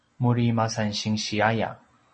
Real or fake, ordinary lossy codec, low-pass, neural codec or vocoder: real; MP3, 32 kbps; 10.8 kHz; none